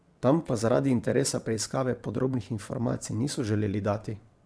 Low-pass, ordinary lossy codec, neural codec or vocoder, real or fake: none; none; vocoder, 22.05 kHz, 80 mel bands, WaveNeXt; fake